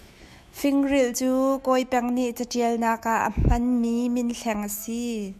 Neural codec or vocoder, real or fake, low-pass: autoencoder, 48 kHz, 128 numbers a frame, DAC-VAE, trained on Japanese speech; fake; 14.4 kHz